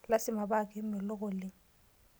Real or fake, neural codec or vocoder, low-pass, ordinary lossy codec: real; none; none; none